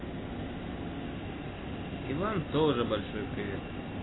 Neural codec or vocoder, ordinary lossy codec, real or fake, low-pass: none; AAC, 16 kbps; real; 7.2 kHz